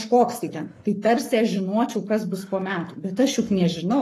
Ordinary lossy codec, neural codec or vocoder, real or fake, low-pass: AAC, 48 kbps; codec, 44.1 kHz, 7.8 kbps, Pupu-Codec; fake; 14.4 kHz